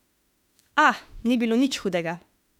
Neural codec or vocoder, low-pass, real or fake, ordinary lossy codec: autoencoder, 48 kHz, 32 numbers a frame, DAC-VAE, trained on Japanese speech; 19.8 kHz; fake; none